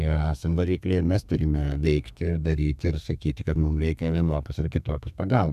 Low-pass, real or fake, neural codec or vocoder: 14.4 kHz; fake; codec, 32 kHz, 1.9 kbps, SNAC